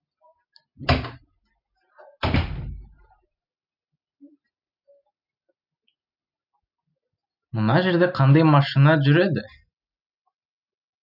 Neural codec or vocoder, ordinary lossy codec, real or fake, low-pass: none; none; real; 5.4 kHz